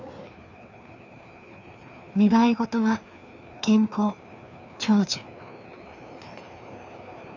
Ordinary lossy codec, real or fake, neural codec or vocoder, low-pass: none; fake; codec, 16 kHz, 2 kbps, FreqCodec, larger model; 7.2 kHz